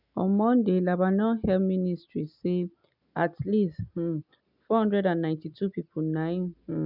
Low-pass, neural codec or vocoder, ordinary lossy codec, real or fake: 5.4 kHz; none; none; real